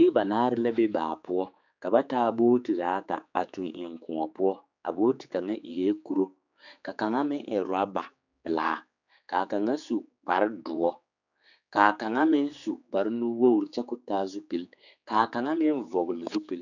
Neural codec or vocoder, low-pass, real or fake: codec, 16 kHz, 4 kbps, X-Codec, HuBERT features, trained on general audio; 7.2 kHz; fake